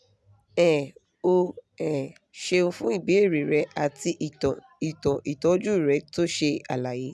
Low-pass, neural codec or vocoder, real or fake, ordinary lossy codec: none; none; real; none